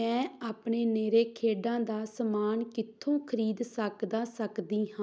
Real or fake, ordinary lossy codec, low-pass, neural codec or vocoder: real; none; none; none